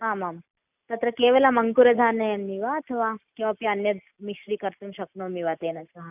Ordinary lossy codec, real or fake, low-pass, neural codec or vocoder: none; real; 3.6 kHz; none